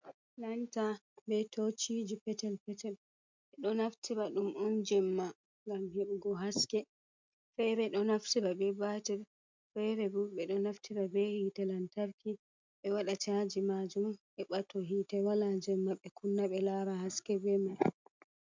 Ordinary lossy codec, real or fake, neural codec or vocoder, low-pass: MP3, 64 kbps; real; none; 7.2 kHz